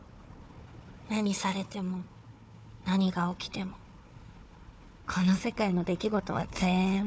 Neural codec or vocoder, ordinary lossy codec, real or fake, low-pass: codec, 16 kHz, 4 kbps, FunCodec, trained on Chinese and English, 50 frames a second; none; fake; none